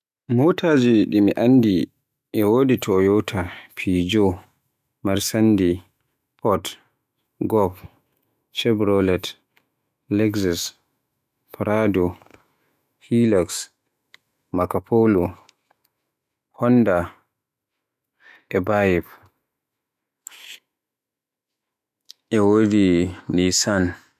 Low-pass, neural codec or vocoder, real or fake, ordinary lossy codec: 14.4 kHz; autoencoder, 48 kHz, 128 numbers a frame, DAC-VAE, trained on Japanese speech; fake; none